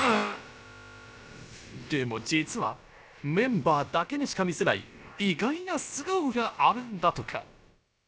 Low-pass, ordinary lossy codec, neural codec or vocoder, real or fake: none; none; codec, 16 kHz, about 1 kbps, DyCAST, with the encoder's durations; fake